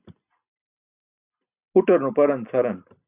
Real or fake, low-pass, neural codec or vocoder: real; 3.6 kHz; none